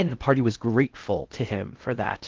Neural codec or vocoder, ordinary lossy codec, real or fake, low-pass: codec, 16 kHz in and 24 kHz out, 0.6 kbps, FocalCodec, streaming, 2048 codes; Opus, 16 kbps; fake; 7.2 kHz